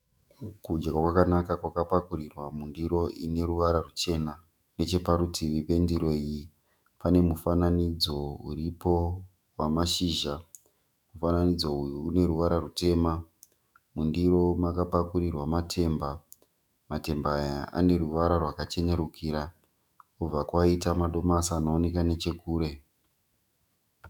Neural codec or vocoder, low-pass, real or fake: autoencoder, 48 kHz, 128 numbers a frame, DAC-VAE, trained on Japanese speech; 19.8 kHz; fake